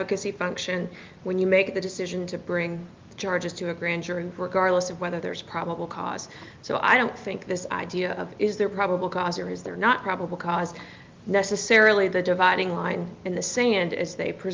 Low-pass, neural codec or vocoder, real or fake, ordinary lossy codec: 7.2 kHz; none; real; Opus, 32 kbps